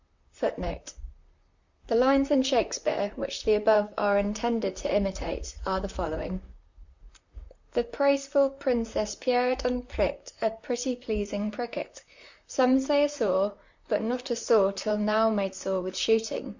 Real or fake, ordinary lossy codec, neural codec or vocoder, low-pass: fake; Opus, 64 kbps; vocoder, 44.1 kHz, 128 mel bands, Pupu-Vocoder; 7.2 kHz